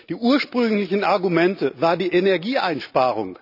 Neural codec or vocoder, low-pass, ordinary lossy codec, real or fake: none; 5.4 kHz; none; real